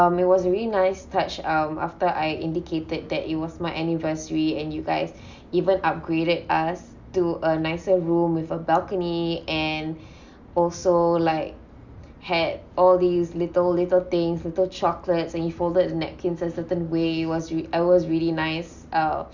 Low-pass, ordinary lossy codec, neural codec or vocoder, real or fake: 7.2 kHz; Opus, 64 kbps; none; real